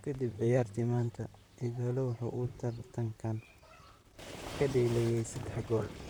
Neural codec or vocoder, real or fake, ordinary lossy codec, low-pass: vocoder, 44.1 kHz, 128 mel bands, Pupu-Vocoder; fake; none; none